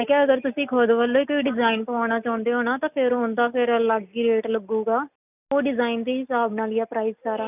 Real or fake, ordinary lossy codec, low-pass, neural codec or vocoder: real; none; 3.6 kHz; none